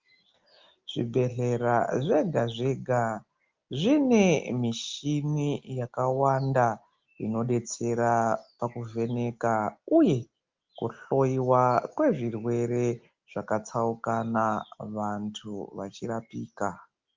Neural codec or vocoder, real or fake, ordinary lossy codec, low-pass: none; real; Opus, 32 kbps; 7.2 kHz